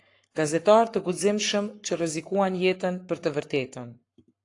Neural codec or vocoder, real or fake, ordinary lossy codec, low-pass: codec, 44.1 kHz, 7.8 kbps, Pupu-Codec; fake; AAC, 48 kbps; 10.8 kHz